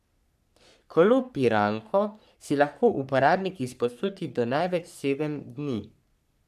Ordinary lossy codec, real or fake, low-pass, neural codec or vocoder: none; fake; 14.4 kHz; codec, 44.1 kHz, 3.4 kbps, Pupu-Codec